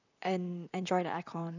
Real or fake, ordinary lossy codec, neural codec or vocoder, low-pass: fake; none; vocoder, 44.1 kHz, 128 mel bands, Pupu-Vocoder; 7.2 kHz